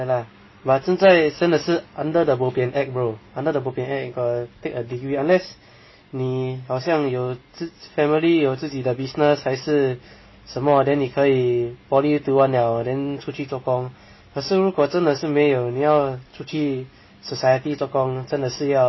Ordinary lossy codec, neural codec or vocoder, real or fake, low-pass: MP3, 24 kbps; none; real; 7.2 kHz